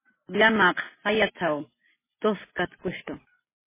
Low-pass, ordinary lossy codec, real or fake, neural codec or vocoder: 3.6 kHz; MP3, 16 kbps; real; none